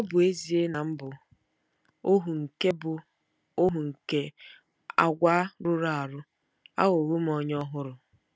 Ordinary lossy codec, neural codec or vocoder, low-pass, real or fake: none; none; none; real